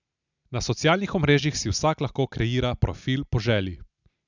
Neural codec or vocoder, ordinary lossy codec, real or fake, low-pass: none; none; real; 7.2 kHz